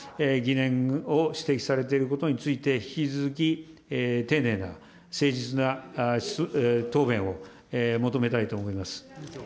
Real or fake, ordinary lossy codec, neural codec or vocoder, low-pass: real; none; none; none